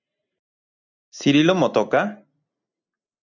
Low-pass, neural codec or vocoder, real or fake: 7.2 kHz; none; real